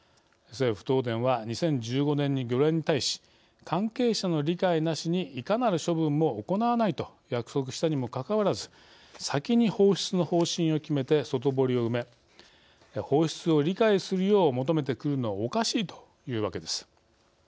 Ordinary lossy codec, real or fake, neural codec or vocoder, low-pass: none; real; none; none